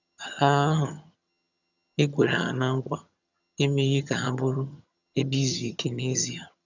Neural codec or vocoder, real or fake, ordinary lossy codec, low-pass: vocoder, 22.05 kHz, 80 mel bands, HiFi-GAN; fake; none; 7.2 kHz